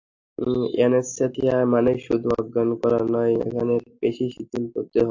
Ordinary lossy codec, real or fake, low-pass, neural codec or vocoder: AAC, 48 kbps; real; 7.2 kHz; none